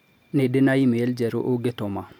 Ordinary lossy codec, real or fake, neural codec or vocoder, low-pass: none; real; none; 19.8 kHz